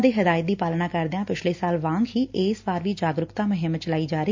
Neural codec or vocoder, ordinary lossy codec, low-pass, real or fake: none; MP3, 48 kbps; 7.2 kHz; real